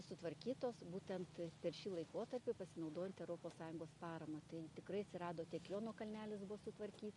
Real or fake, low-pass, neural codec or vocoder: real; 10.8 kHz; none